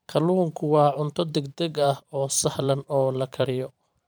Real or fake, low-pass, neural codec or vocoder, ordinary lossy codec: fake; none; vocoder, 44.1 kHz, 128 mel bands every 512 samples, BigVGAN v2; none